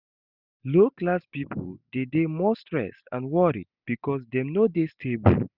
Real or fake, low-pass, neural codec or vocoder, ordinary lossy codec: real; 5.4 kHz; none; none